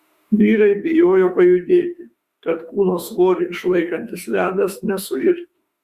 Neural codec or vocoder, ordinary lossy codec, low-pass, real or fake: autoencoder, 48 kHz, 32 numbers a frame, DAC-VAE, trained on Japanese speech; Opus, 64 kbps; 14.4 kHz; fake